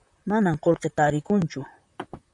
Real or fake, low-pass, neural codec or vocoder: fake; 10.8 kHz; vocoder, 44.1 kHz, 128 mel bands, Pupu-Vocoder